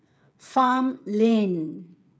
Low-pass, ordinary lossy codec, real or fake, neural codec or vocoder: none; none; fake; codec, 16 kHz, 8 kbps, FreqCodec, smaller model